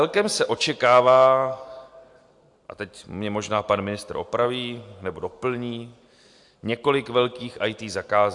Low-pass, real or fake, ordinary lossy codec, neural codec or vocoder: 10.8 kHz; real; AAC, 64 kbps; none